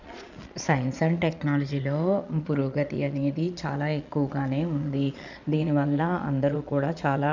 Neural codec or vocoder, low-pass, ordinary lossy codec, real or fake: vocoder, 22.05 kHz, 80 mel bands, Vocos; 7.2 kHz; none; fake